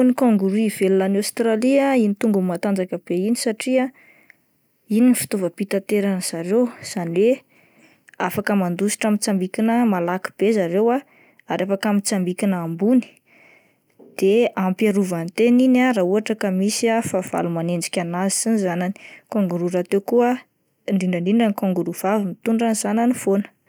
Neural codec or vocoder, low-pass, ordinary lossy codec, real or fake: none; none; none; real